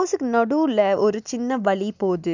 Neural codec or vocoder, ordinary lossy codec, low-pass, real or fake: none; none; 7.2 kHz; real